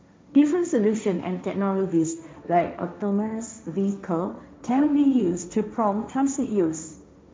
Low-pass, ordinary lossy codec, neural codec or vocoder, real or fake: none; none; codec, 16 kHz, 1.1 kbps, Voila-Tokenizer; fake